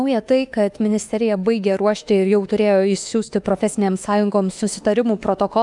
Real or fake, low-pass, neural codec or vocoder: fake; 10.8 kHz; autoencoder, 48 kHz, 32 numbers a frame, DAC-VAE, trained on Japanese speech